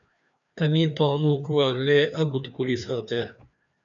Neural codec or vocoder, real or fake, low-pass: codec, 16 kHz, 2 kbps, FreqCodec, larger model; fake; 7.2 kHz